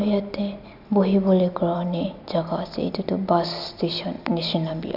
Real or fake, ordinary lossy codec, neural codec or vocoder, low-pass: real; none; none; 5.4 kHz